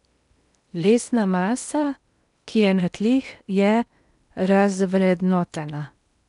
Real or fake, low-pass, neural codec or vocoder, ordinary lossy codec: fake; 10.8 kHz; codec, 16 kHz in and 24 kHz out, 0.8 kbps, FocalCodec, streaming, 65536 codes; none